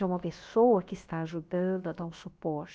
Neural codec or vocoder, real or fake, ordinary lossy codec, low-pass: codec, 16 kHz, about 1 kbps, DyCAST, with the encoder's durations; fake; none; none